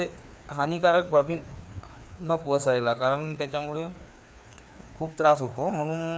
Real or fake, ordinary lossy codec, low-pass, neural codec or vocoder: fake; none; none; codec, 16 kHz, 2 kbps, FreqCodec, larger model